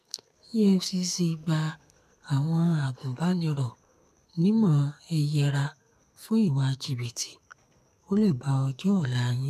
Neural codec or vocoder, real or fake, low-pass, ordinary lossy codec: codec, 44.1 kHz, 2.6 kbps, SNAC; fake; 14.4 kHz; none